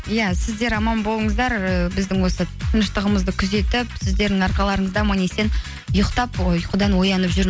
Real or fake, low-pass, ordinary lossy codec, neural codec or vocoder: real; none; none; none